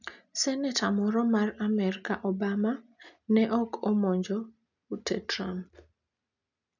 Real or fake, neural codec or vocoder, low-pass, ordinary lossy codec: real; none; 7.2 kHz; none